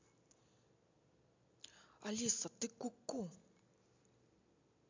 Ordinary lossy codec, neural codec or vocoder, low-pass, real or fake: MP3, 64 kbps; none; 7.2 kHz; real